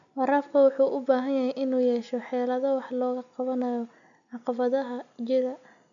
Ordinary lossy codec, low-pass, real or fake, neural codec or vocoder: none; 7.2 kHz; real; none